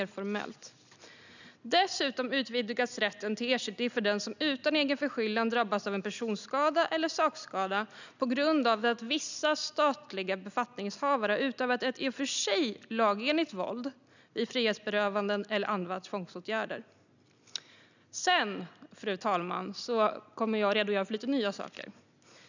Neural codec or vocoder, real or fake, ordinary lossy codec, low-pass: none; real; none; 7.2 kHz